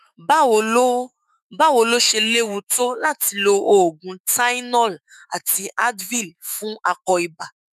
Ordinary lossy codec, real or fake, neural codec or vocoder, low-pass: none; fake; autoencoder, 48 kHz, 128 numbers a frame, DAC-VAE, trained on Japanese speech; 14.4 kHz